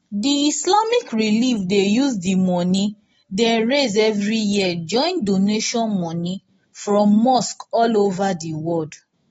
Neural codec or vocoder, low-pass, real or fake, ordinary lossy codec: none; 19.8 kHz; real; AAC, 24 kbps